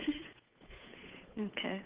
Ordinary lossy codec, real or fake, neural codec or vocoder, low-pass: Opus, 32 kbps; fake; codec, 16 kHz, 8 kbps, FunCodec, trained on Chinese and English, 25 frames a second; 3.6 kHz